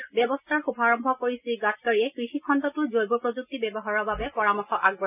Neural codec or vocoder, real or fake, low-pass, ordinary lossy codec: none; real; 3.6 kHz; none